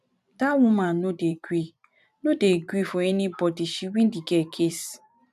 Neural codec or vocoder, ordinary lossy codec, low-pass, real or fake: vocoder, 44.1 kHz, 128 mel bands every 512 samples, BigVGAN v2; none; 14.4 kHz; fake